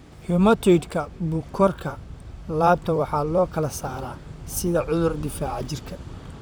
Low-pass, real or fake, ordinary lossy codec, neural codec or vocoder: none; fake; none; vocoder, 44.1 kHz, 128 mel bands, Pupu-Vocoder